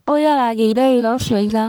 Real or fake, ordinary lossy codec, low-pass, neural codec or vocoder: fake; none; none; codec, 44.1 kHz, 1.7 kbps, Pupu-Codec